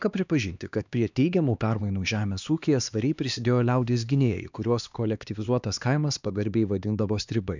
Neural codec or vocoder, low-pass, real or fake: codec, 16 kHz, 2 kbps, X-Codec, HuBERT features, trained on LibriSpeech; 7.2 kHz; fake